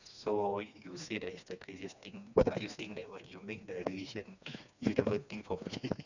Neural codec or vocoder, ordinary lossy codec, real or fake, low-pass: codec, 16 kHz, 2 kbps, FreqCodec, smaller model; none; fake; 7.2 kHz